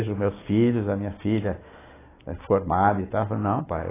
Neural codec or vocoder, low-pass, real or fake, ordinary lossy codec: none; 3.6 kHz; real; AAC, 16 kbps